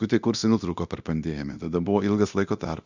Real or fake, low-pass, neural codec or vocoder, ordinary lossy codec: fake; 7.2 kHz; codec, 24 kHz, 0.9 kbps, DualCodec; Opus, 64 kbps